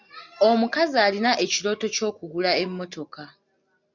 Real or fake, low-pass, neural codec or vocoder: real; 7.2 kHz; none